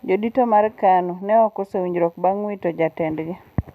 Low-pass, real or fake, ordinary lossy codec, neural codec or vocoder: 14.4 kHz; real; none; none